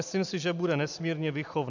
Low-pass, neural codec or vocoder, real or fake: 7.2 kHz; none; real